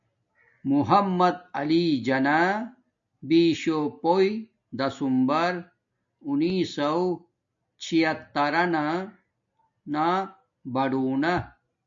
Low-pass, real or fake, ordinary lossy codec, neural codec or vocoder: 7.2 kHz; real; MP3, 48 kbps; none